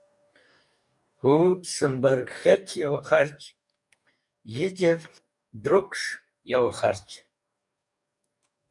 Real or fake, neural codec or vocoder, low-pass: fake; codec, 44.1 kHz, 2.6 kbps, DAC; 10.8 kHz